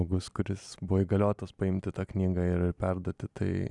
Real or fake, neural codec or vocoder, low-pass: real; none; 10.8 kHz